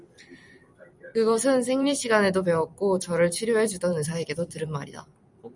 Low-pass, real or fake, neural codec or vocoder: 10.8 kHz; fake; vocoder, 44.1 kHz, 128 mel bands every 256 samples, BigVGAN v2